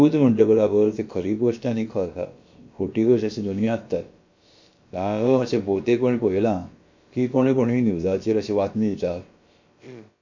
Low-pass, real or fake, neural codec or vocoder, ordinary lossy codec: 7.2 kHz; fake; codec, 16 kHz, about 1 kbps, DyCAST, with the encoder's durations; MP3, 48 kbps